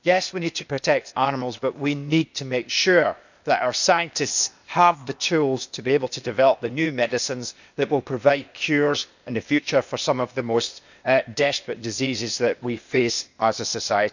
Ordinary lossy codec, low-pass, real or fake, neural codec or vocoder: none; 7.2 kHz; fake; codec, 16 kHz, 0.8 kbps, ZipCodec